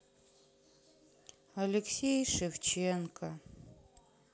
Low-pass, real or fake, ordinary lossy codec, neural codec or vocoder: none; real; none; none